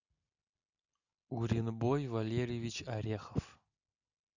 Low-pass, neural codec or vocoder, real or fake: 7.2 kHz; none; real